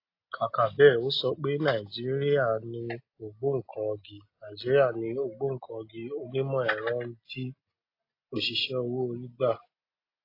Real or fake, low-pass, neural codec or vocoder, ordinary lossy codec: real; 5.4 kHz; none; AAC, 32 kbps